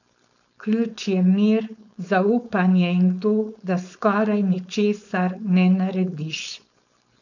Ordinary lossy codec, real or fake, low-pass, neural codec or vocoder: none; fake; 7.2 kHz; codec, 16 kHz, 4.8 kbps, FACodec